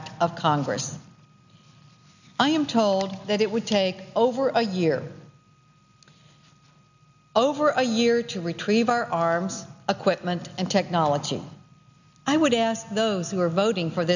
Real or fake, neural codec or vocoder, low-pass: real; none; 7.2 kHz